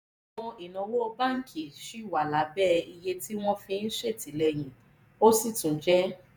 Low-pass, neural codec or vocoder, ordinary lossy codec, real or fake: none; vocoder, 48 kHz, 128 mel bands, Vocos; none; fake